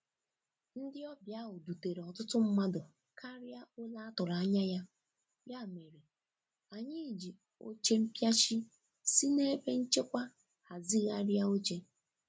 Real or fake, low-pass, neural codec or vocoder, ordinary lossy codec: real; none; none; none